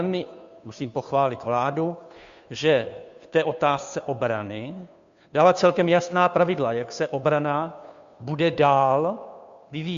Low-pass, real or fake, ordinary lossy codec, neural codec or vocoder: 7.2 kHz; fake; MP3, 64 kbps; codec, 16 kHz, 2 kbps, FunCodec, trained on Chinese and English, 25 frames a second